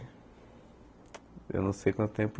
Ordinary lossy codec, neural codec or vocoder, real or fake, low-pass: none; none; real; none